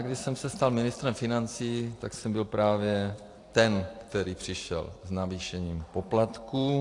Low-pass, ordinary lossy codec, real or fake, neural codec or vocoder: 10.8 kHz; AAC, 48 kbps; fake; vocoder, 44.1 kHz, 128 mel bands every 256 samples, BigVGAN v2